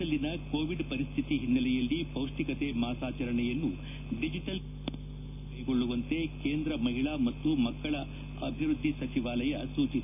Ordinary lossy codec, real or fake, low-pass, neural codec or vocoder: none; real; 3.6 kHz; none